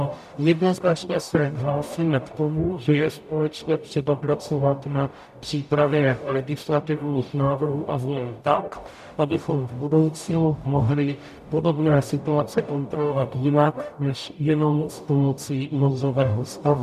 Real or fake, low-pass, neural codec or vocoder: fake; 14.4 kHz; codec, 44.1 kHz, 0.9 kbps, DAC